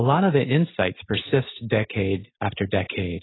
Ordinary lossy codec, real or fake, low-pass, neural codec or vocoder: AAC, 16 kbps; real; 7.2 kHz; none